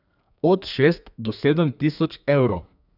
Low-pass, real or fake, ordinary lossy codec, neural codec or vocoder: 5.4 kHz; fake; none; codec, 32 kHz, 1.9 kbps, SNAC